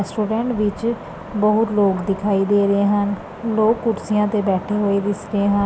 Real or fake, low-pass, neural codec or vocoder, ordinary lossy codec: real; none; none; none